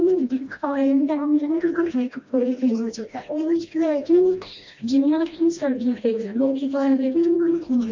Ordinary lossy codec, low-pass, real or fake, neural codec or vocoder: MP3, 48 kbps; 7.2 kHz; fake; codec, 16 kHz, 1 kbps, FreqCodec, smaller model